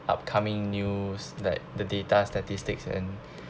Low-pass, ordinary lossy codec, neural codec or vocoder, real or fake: none; none; none; real